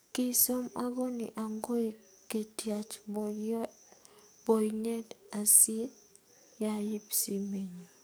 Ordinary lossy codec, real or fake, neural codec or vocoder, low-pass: none; fake; codec, 44.1 kHz, 7.8 kbps, DAC; none